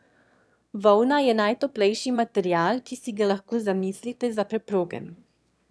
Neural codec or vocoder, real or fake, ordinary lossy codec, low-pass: autoencoder, 22.05 kHz, a latent of 192 numbers a frame, VITS, trained on one speaker; fake; none; none